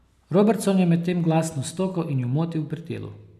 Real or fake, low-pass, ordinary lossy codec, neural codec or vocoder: real; 14.4 kHz; none; none